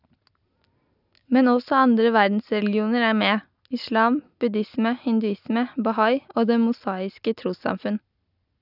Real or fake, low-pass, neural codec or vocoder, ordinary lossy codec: real; 5.4 kHz; none; none